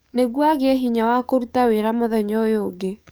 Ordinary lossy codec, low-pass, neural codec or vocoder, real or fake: none; none; codec, 44.1 kHz, 7.8 kbps, DAC; fake